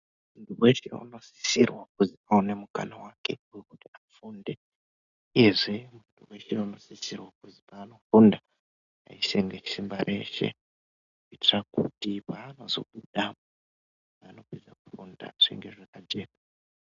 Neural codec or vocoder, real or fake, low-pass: none; real; 7.2 kHz